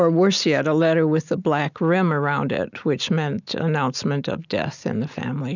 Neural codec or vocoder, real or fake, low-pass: none; real; 7.2 kHz